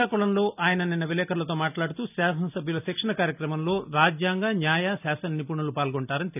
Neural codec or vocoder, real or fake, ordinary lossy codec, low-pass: none; real; none; 3.6 kHz